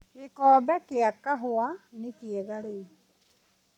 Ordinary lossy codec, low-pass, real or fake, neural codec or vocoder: none; 19.8 kHz; fake; codec, 44.1 kHz, 7.8 kbps, Pupu-Codec